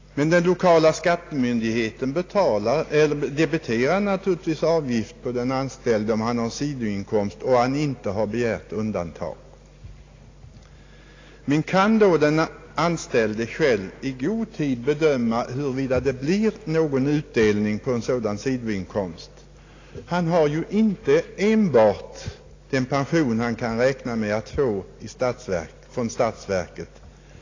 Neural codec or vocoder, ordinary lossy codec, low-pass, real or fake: none; AAC, 32 kbps; 7.2 kHz; real